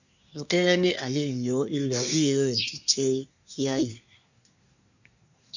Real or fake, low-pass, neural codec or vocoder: fake; 7.2 kHz; codec, 24 kHz, 1 kbps, SNAC